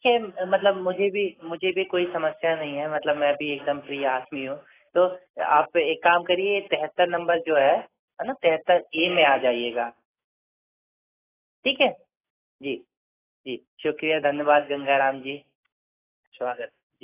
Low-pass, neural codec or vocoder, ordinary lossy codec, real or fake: 3.6 kHz; none; AAC, 16 kbps; real